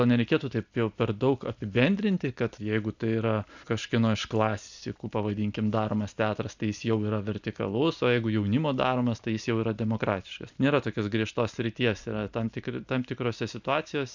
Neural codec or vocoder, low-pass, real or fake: none; 7.2 kHz; real